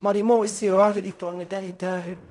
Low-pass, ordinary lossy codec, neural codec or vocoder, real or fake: 10.8 kHz; none; codec, 16 kHz in and 24 kHz out, 0.4 kbps, LongCat-Audio-Codec, fine tuned four codebook decoder; fake